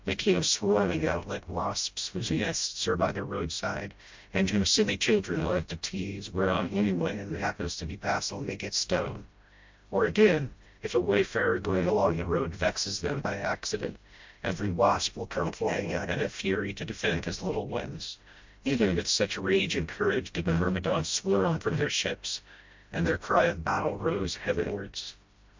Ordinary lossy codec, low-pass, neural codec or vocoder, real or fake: MP3, 48 kbps; 7.2 kHz; codec, 16 kHz, 0.5 kbps, FreqCodec, smaller model; fake